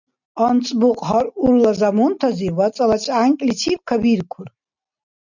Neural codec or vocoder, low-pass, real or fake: none; 7.2 kHz; real